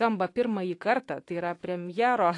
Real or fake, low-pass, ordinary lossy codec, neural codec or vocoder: fake; 10.8 kHz; AAC, 48 kbps; autoencoder, 48 kHz, 128 numbers a frame, DAC-VAE, trained on Japanese speech